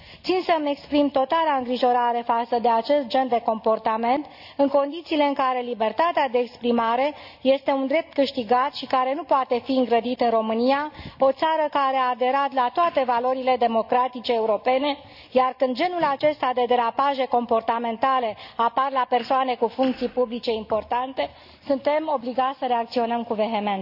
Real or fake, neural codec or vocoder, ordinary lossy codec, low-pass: real; none; none; 5.4 kHz